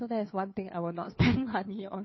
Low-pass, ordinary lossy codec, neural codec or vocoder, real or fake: 7.2 kHz; MP3, 24 kbps; codec, 16 kHz, 2 kbps, FunCodec, trained on Chinese and English, 25 frames a second; fake